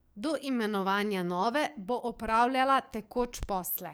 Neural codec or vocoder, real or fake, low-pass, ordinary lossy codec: codec, 44.1 kHz, 7.8 kbps, DAC; fake; none; none